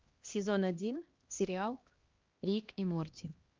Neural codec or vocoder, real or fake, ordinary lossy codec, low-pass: codec, 16 kHz, 1 kbps, X-Codec, HuBERT features, trained on LibriSpeech; fake; Opus, 32 kbps; 7.2 kHz